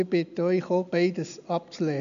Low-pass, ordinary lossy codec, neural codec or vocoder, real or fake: 7.2 kHz; none; none; real